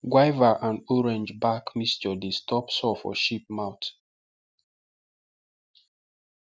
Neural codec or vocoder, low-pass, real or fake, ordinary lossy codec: none; none; real; none